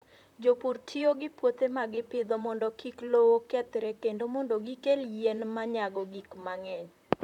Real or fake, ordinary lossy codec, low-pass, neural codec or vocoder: fake; none; 19.8 kHz; vocoder, 44.1 kHz, 128 mel bands, Pupu-Vocoder